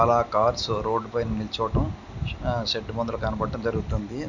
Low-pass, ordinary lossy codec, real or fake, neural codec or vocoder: 7.2 kHz; none; real; none